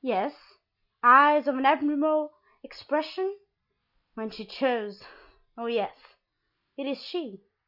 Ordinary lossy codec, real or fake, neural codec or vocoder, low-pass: Opus, 64 kbps; real; none; 5.4 kHz